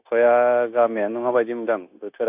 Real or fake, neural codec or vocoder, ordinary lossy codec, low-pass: fake; codec, 16 kHz, 0.9 kbps, LongCat-Audio-Codec; AAC, 32 kbps; 3.6 kHz